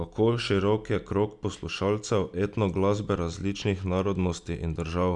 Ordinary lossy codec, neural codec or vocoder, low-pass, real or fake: none; none; 10.8 kHz; real